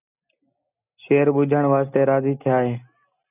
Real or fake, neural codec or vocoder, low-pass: real; none; 3.6 kHz